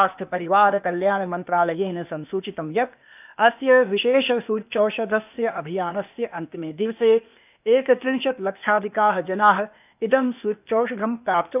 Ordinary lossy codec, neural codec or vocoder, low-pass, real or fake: none; codec, 16 kHz, 0.8 kbps, ZipCodec; 3.6 kHz; fake